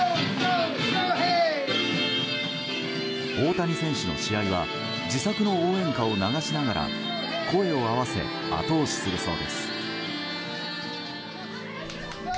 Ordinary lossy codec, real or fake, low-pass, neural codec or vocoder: none; real; none; none